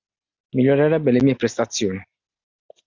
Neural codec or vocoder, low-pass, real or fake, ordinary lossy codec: none; 7.2 kHz; real; Opus, 64 kbps